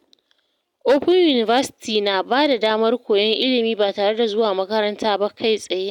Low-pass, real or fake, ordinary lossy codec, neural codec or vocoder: 19.8 kHz; real; none; none